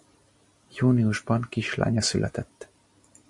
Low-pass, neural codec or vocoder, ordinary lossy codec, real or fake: 10.8 kHz; none; MP3, 48 kbps; real